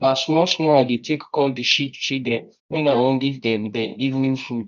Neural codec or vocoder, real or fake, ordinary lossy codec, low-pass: codec, 24 kHz, 0.9 kbps, WavTokenizer, medium music audio release; fake; none; 7.2 kHz